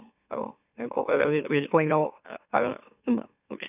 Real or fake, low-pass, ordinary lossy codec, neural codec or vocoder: fake; 3.6 kHz; none; autoencoder, 44.1 kHz, a latent of 192 numbers a frame, MeloTTS